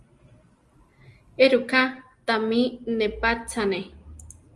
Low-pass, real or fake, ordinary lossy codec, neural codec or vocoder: 10.8 kHz; real; Opus, 32 kbps; none